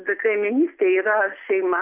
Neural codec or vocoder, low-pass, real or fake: none; 3.6 kHz; real